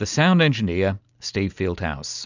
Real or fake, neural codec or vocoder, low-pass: real; none; 7.2 kHz